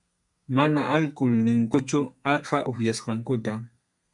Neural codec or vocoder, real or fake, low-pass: codec, 32 kHz, 1.9 kbps, SNAC; fake; 10.8 kHz